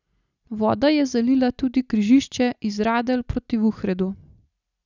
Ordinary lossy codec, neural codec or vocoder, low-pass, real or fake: none; none; 7.2 kHz; real